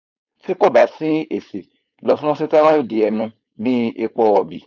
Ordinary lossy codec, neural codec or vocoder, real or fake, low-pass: none; codec, 16 kHz, 4.8 kbps, FACodec; fake; 7.2 kHz